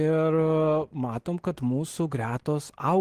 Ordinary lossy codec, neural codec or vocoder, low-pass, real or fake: Opus, 16 kbps; none; 14.4 kHz; real